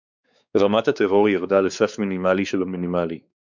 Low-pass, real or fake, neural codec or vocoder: 7.2 kHz; fake; codec, 16 kHz, 4 kbps, X-Codec, WavLM features, trained on Multilingual LibriSpeech